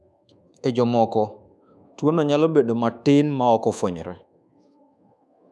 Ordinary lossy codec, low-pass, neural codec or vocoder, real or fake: none; none; codec, 24 kHz, 1.2 kbps, DualCodec; fake